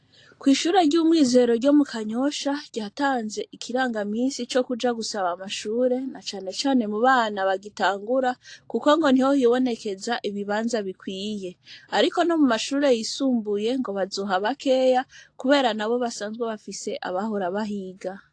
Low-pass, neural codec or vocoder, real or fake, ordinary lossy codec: 9.9 kHz; none; real; AAC, 48 kbps